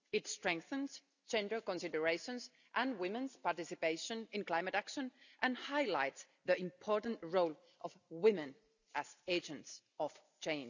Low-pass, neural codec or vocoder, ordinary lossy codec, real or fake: 7.2 kHz; none; none; real